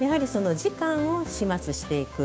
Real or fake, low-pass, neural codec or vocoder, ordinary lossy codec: fake; none; codec, 16 kHz, 6 kbps, DAC; none